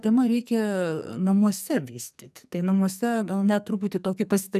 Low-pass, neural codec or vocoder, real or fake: 14.4 kHz; codec, 44.1 kHz, 2.6 kbps, SNAC; fake